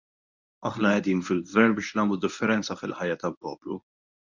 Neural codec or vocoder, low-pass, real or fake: codec, 24 kHz, 0.9 kbps, WavTokenizer, medium speech release version 1; 7.2 kHz; fake